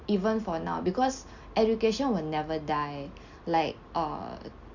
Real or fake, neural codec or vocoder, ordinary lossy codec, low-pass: real; none; Opus, 64 kbps; 7.2 kHz